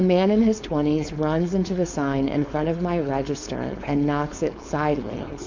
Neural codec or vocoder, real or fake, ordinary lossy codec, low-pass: codec, 16 kHz, 4.8 kbps, FACodec; fake; MP3, 48 kbps; 7.2 kHz